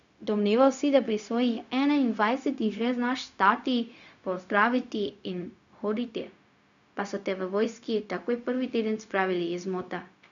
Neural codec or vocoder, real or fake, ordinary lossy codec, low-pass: codec, 16 kHz, 0.4 kbps, LongCat-Audio-Codec; fake; none; 7.2 kHz